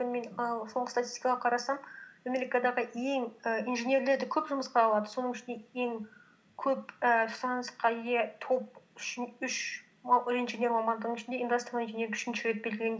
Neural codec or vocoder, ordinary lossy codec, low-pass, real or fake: none; none; none; real